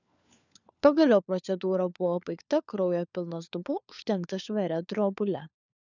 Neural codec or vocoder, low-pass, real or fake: codec, 16 kHz, 4 kbps, FunCodec, trained on LibriTTS, 50 frames a second; 7.2 kHz; fake